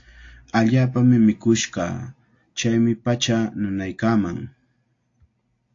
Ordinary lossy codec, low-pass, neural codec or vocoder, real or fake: AAC, 48 kbps; 7.2 kHz; none; real